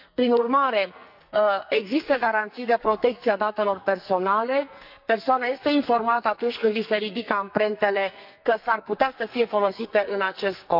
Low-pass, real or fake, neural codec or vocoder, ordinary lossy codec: 5.4 kHz; fake; codec, 44.1 kHz, 2.6 kbps, SNAC; none